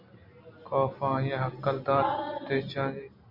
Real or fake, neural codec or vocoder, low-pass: real; none; 5.4 kHz